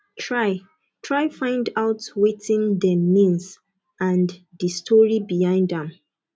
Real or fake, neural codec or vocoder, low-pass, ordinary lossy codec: real; none; none; none